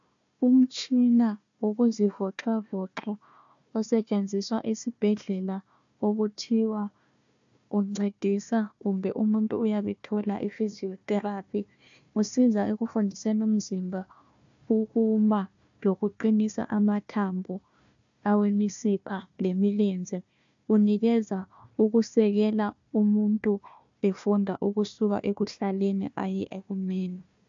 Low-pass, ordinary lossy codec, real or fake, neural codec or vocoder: 7.2 kHz; AAC, 64 kbps; fake; codec, 16 kHz, 1 kbps, FunCodec, trained on Chinese and English, 50 frames a second